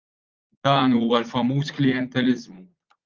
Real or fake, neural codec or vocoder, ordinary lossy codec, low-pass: fake; vocoder, 44.1 kHz, 80 mel bands, Vocos; Opus, 32 kbps; 7.2 kHz